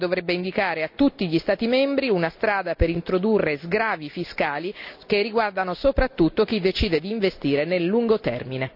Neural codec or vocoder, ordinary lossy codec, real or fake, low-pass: none; none; real; 5.4 kHz